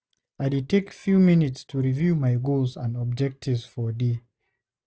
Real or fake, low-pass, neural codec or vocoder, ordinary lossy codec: real; none; none; none